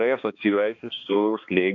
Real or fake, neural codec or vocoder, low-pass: fake; codec, 16 kHz, 2 kbps, X-Codec, HuBERT features, trained on balanced general audio; 7.2 kHz